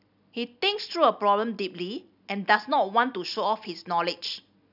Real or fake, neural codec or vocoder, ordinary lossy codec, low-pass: real; none; none; 5.4 kHz